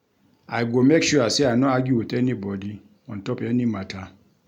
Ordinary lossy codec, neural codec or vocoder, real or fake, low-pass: none; none; real; 19.8 kHz